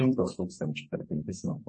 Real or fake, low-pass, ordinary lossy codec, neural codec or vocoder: fake; 10.8 kHz; MP3, 32 kbps; codec, 32 kHz, 1.9 kbps, SNAC